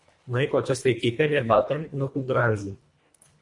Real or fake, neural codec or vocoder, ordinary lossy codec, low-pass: fake; codec, 24 kHz, 1.5 kbps, HILCodec; MP3, 48 kbps; 10.8 kHz